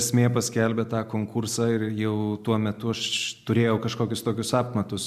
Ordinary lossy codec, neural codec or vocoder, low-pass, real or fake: MP3, 96 kbps; none; 14.4 kHz; real